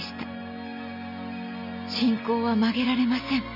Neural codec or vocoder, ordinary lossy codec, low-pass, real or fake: none; none; 5.4 kHz; real